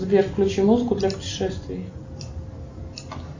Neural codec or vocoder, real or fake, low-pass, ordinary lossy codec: none; real; 7.2 kHz; AAC, 48 kbps